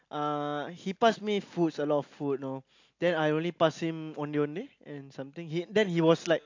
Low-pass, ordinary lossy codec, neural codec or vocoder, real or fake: 7.2 kHz; AAC, 48 kbps; none; real